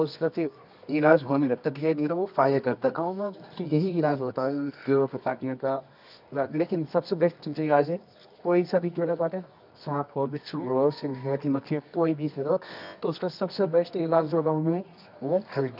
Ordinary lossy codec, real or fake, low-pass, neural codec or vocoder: none; fake; 5.4 kHz; codec, 24 kHz, 0.9 kbps, WavTokenizer, medium music audio release